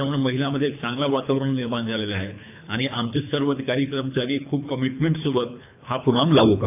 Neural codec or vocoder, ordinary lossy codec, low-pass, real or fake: codec, 24 kHz, 3 kbps, HILCodec; AAC, 32 kbps; 3.6 kHz; fake